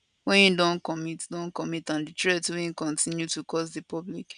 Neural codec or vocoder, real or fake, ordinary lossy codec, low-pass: none; real; none; 9.9 kHz